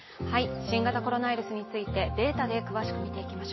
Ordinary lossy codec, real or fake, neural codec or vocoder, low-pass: MP3, 24 kbps; real; none; 7.2 kHz